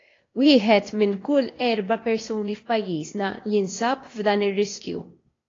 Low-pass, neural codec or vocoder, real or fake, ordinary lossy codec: 7.2 kHz; codec, 16 kHz, 0.8 kbps, ZipCodec; fake; AAC, 32 kbps